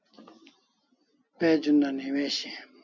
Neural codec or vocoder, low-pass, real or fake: none; 7.2 kHz; real